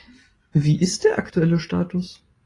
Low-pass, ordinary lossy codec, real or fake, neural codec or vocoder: 10.8 kHz; AAC, 32 kbps; real; none